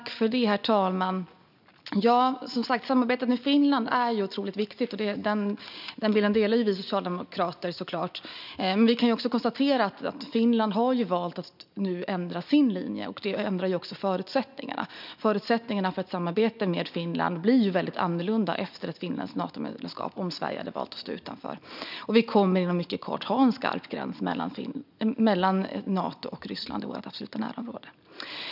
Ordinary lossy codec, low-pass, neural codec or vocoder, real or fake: none; 5.4 kHz; none; real